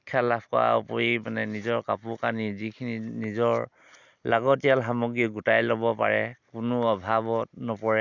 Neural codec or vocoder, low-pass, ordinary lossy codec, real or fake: none; 7.2 kHz; none; real